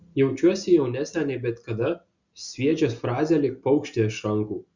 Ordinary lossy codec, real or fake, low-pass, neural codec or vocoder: Opus, 64 kbps; real; 7.2 kHz; none